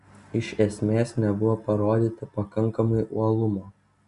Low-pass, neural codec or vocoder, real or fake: 10.8 kHz; none; real